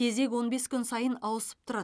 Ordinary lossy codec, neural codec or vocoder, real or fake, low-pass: none; none; real; none